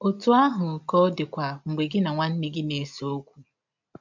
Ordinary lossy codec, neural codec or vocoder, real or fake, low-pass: MP3, 64 kbps; none; real; 7.2 kHz